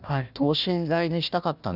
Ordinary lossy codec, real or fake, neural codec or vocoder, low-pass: none; fake; codec, 16 kHz, 1 kbps, FunCodec, trained on Chinese and English, 50 frames a second; 5.4 kHz